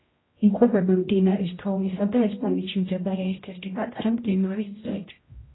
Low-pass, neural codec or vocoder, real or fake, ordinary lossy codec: 7.2 kHz; codec, 16 kHz, 0.5 kbps, X-Codec, HuBERT features, trained on general audio; fake; AAC, 16 kbps